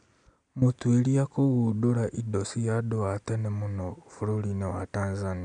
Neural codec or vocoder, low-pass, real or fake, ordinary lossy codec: none; 9.9 kHz; real; none